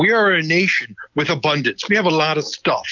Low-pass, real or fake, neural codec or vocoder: 7.2 kHz; real; none